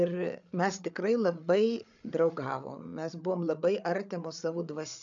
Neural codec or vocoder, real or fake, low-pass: codec, 16 kHz, 8 kbps, FreqCodec, larger model; fake; 7.2 kHz